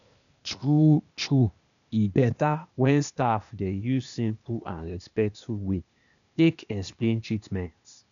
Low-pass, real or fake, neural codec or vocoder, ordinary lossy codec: 7.2 kHz; fake; codec, 16 kHz, 0.8 kbps, ZipCodec; none